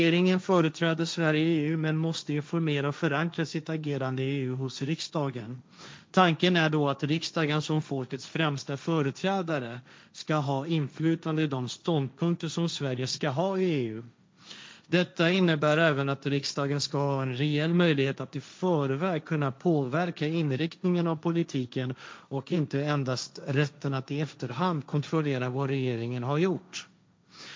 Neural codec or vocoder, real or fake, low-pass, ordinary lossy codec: codec, 16 kHz, 1.1 kbps, Voila-Tokenizer; fake; none; none